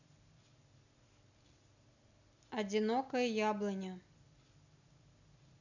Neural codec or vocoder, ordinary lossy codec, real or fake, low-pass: none; none; real; 7.2 kHz